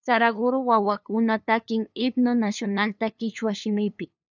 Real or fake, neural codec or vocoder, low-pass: fake; codec, 16 kHz, 2 kbps, FunCodec, trained on LibriTTS, 25 frames a second; 7.2 kHz